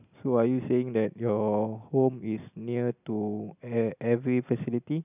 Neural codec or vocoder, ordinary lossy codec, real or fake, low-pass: none; none; real; 3.6 kHz